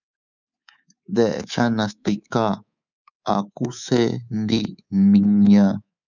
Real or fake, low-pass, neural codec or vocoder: fake; 7.2 kHz; codec, 24 kHz, 3.1 kbps, DualCodec